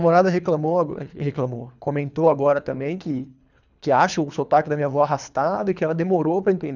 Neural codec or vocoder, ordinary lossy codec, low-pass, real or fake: codec, 24 kHz, 3 kbps, HILCodec; none; 7.2 kHz; fake